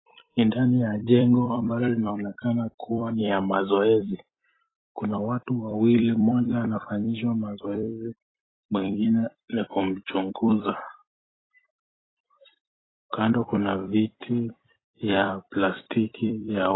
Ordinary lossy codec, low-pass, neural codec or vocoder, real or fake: AAC, 16 kbps; 7.2 kHz; vocoder, 44.1 kHz, 128 mel bands every 256 samples, BigVGAN v2; fake